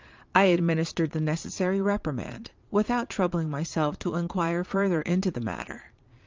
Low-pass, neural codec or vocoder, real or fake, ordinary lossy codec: 7.2 kHz; vocoder, 22.05 kHz, 80 mel bands, Vocos; fake; Opus, 24 kbps